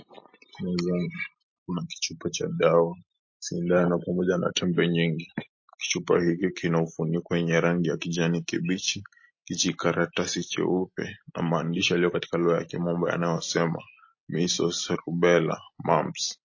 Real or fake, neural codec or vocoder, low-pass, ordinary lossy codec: real; none; 7.2 kHz; MP3, 32 kbps